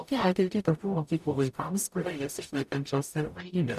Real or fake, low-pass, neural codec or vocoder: fake; 14.4 kHz; codec, 44.1 kHz, 0.9 kbps, DAC